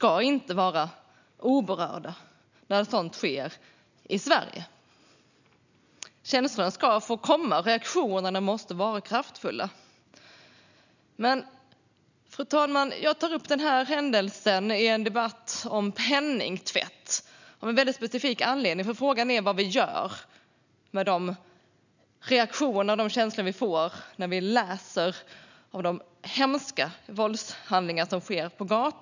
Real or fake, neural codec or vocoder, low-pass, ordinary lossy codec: real; none; 7.2 kHz; none